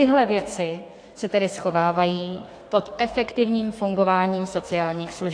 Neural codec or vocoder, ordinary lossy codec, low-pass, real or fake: codec, 32 kHz, 1.9 kbps, SNAC; AAC, 48 kbps; 9.9 kHz; fake